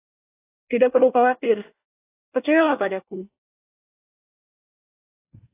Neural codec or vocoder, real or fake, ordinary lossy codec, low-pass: codec, 24 kHz, 1 kbps, SNAC; fake; AAC, 16 kbps; 3.6 kHz